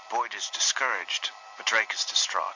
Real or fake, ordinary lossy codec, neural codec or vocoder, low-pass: real; MP3, 48 kbps; none; 7.2 kHz